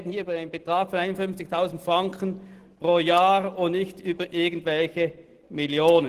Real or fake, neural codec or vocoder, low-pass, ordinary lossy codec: real; none; 14.4 kHz; Opus, 16 kbps